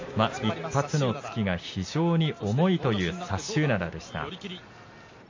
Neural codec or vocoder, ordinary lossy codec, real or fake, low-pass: none; MP3, 32 kbps; real; 7.2 kHz